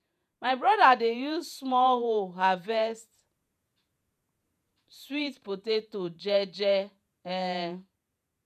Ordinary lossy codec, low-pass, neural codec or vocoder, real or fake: none; 14.4 kHz; vocoder, 48 kHz, 128 mel bands, Vocos; fake